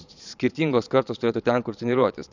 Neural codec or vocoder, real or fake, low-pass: vocoder, 22.05 kHz, 80 mel bands, Vocos; fake; 7.2 kHz